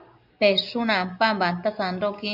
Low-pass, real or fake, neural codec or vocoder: 5.4 kHz; real; none